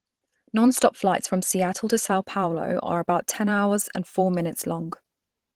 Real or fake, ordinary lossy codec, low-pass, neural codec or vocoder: fake; Opus, 16 kbps; 19.8 kHz; vocoder, 44.1 kHz, 128 mel bands every 512 samples, BigVGAN v2